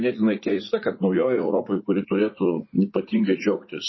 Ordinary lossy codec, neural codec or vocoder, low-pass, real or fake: MP3, 24 kbps; vocoder, 44.1 kHz, 80 mel bands, Vocos; 7.2 kHz; fake